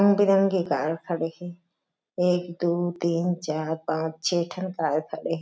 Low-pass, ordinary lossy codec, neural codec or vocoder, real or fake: none; none; none; real